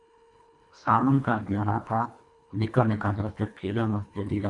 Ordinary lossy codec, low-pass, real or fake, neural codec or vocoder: AAC, 64 kbps; 10.8 kHz; fake; codec, 24 kHz, 1.5 kbps, HILCodec